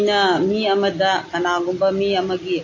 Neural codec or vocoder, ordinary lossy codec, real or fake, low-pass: none; AAC, 32 kbps; real; 7.2 kHz